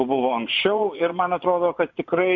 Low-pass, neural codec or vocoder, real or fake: 7.2 kHz; vocoder, 24 kHz, 100 mel bands, Vocos; fake